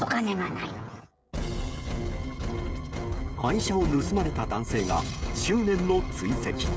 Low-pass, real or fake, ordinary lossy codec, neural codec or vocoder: none; fake; none; codec, 16 kHz, 16 kbps, FreqCodec, smaller model